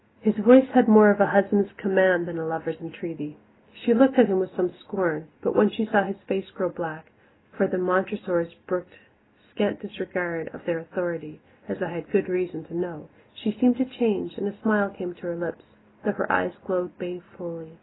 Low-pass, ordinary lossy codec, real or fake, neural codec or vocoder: 7.2 kHz; AAC, 16 kbps; real; none